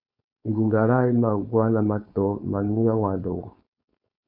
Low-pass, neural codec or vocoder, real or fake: 5.4 kHz; codec, 16 kHz, 4.8 kbps, FACodec; fake